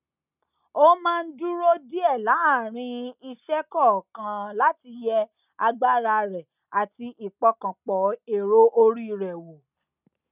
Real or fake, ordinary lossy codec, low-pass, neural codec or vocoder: real; none; 3.6 kHz; none